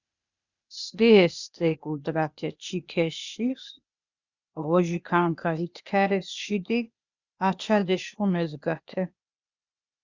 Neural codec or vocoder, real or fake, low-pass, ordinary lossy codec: codec, 16 kHz, 0.8 kbps, ZipCodec; fake; 7.2 kHz; Opus, 64 kbps